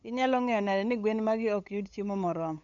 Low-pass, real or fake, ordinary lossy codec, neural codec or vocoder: 7.2 kHz; fake; none; codec, 16 kHz, 8 kbps, FunCodec, trained on LibriTTS, 25 frames a second